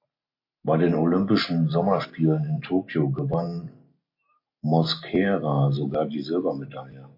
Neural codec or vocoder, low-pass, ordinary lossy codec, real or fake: none; 5.4 kHz; MP3, 32 kbps; real